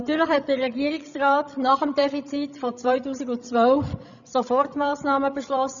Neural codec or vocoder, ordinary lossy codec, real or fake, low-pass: codec, 16 kHz, 16 kbps, FreqCodec, larger model; none; fake; 7.2 kHz